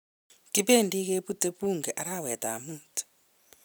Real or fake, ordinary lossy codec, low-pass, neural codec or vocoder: fake; none; none; vocoder, 44.1 kHz, 128 mel bands every 256 samples, BigVGAN v2